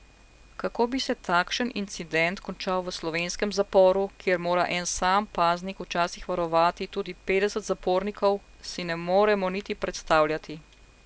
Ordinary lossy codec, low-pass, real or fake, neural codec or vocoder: none; none; real; none